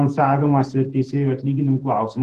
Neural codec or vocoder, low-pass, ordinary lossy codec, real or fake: vocoder, 48 kHz, 128 mel bands, Vocos; 14.4 kHz; Opus, 16 kbps; fake